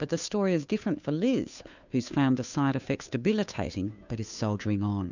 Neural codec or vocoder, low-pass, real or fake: codec, 16 kHz, 2 kbps, FunCodec, trained on Chinese and English, 25 frames a second; 7.2 kHz; fake